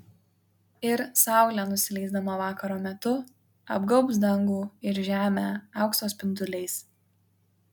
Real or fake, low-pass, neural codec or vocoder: real; 19.8 kHz; none